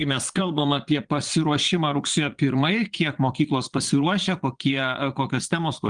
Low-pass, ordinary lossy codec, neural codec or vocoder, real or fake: 9.9 kHz; Opus, 24 kbps; vocoder, 22.05 kHz, 80 mel bands, Vocos; fake